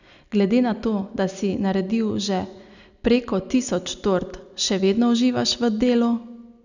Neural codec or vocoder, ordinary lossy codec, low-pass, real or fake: none; none; 7.2 kHz; real